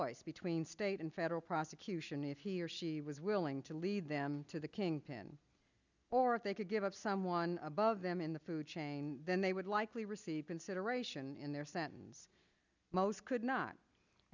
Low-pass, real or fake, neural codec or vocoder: 7.2 kHz; real; none